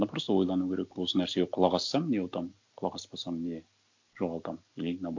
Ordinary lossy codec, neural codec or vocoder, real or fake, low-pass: MP3, 64 kbps; none; real; 7.2 kHz